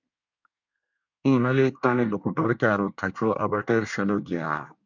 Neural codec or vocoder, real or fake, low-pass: codec, 24 kHz, 1 kbps, SNAC; fake; 7.2 kHz